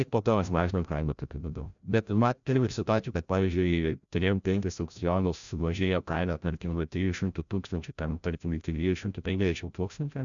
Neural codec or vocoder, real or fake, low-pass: codec, 16 kHz, 0.5 kbps, FreqCodec, larger model; fake; 7.2 kHz